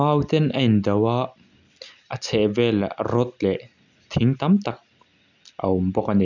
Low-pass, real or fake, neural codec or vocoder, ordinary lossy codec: 7.2 kHz; real; none; none